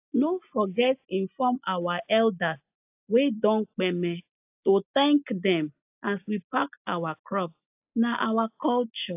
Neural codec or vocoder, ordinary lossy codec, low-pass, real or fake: none; AAC, 32 kbps; 3.6 kHz; real